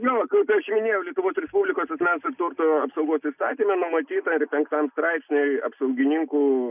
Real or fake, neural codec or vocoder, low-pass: real; none; 3.6 kHz